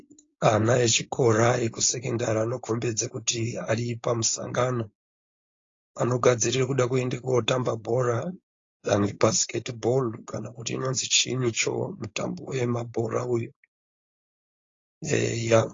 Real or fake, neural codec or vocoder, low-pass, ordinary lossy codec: fake; codec, 16 kHz, 4.8 kbps, FACodec; 7.2 kHz; AAC, 32 kbps